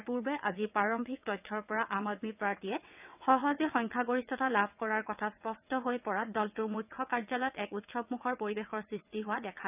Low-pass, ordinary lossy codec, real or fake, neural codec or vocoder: 3.6 kHz; none; fake; vocoder, 22.05 kHz, 80 mel bands, WaveNeXt